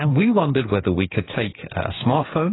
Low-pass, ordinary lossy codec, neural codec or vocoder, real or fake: 7.2 kHz; AAC, 16 kbps; codec, 16 kHz, 8 kbps, FreqCodec, smaller model; fake